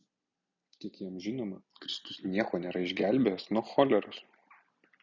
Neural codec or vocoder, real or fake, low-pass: none; real; 7.2 kHz